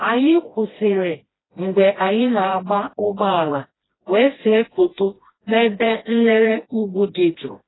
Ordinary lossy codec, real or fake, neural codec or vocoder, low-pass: AAC, 16 kbps; fake; codec, 16 kHz, 1 kbps, FreqCodec, smaller model; 7.2 kHz